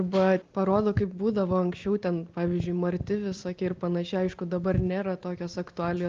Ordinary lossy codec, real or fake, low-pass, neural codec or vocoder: Opus, 32 kbps; real; 7.2 kHz; none